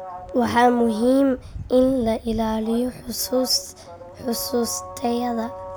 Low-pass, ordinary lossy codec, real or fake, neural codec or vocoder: none; none; real; none